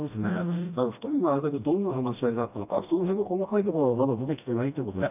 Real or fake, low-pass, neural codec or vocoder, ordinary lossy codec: fake; 3.6 kHz; codec, 16 kHz, 1 kbps, FreqCodec, smaller model; none